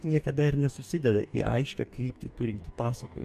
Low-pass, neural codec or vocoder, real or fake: 14.4 kHz; codec, 44.1 kHz, 2.6 kbps, DAC; fake